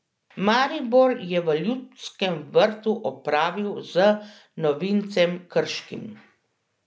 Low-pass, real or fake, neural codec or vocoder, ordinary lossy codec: none; real; none; none